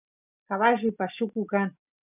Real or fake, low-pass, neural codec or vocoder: real; 3.6 kHz; none